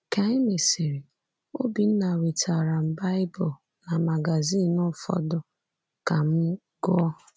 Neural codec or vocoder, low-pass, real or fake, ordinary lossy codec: none; none; real; none